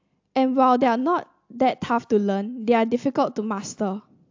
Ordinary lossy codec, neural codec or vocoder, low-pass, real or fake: AAC, 48 kbps; none; 7.2 kHz; real